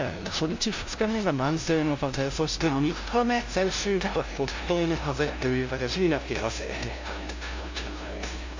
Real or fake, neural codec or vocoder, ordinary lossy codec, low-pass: fake; codec, 16 kHz, 0.5 kbps, FunCodec, trained on LibriTTS, 25 frames a second; MP3, 64 kbps; 7.2 kHz